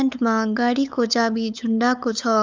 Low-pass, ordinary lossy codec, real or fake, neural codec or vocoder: none; none; fake; codec, 16 kHz, 8 kbps, FunCodec, trained on LibriTTS, 25 frames a second